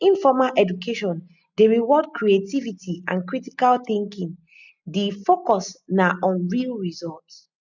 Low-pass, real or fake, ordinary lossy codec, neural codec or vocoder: 7.2 kHz; real; none; none